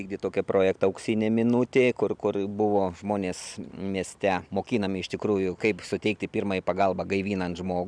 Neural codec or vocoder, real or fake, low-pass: none; real; 9.9 kHz